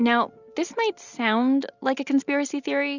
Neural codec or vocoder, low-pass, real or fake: none; 7.2 kHz; real